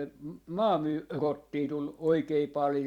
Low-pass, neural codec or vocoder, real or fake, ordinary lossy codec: 19.8 kHz; vocoder, 44.1 kHz, 128 mel bands every 256 samples, BigVGAN v2; fake; Opus, 64 kbps